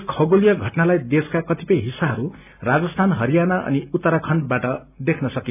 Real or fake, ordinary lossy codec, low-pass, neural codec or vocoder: real; none; 3.6 kHz; none